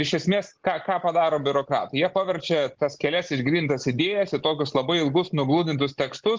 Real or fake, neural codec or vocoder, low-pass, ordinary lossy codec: real; none; 7.2 kHz; Opus, 16 kbps